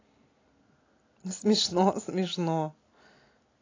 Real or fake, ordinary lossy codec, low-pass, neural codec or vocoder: real; AAC, 32 kbps; 7.2 kHz; none